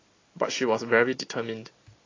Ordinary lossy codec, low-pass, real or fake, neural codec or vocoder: AAC, 32 kbps; 7.2 kHz; real; none